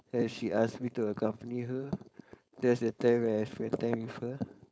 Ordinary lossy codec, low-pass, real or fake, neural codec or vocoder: none; none; fake; codec, 16 kHz, 4.8 kbps, FACodec